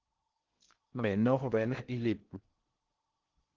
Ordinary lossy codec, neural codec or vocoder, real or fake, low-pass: Opus, 16 kbps; codec, 16 kHz in and 24 kHz out, 0.6 kbps, FocalCodec, streaming, 4096 codes; fake; 7.2 kHz